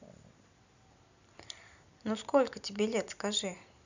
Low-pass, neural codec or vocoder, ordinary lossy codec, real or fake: 7.2 kHz; vocoder, 44.1 kHz, 128 mel bands every 256 samples, BigVGAN v2; none; fake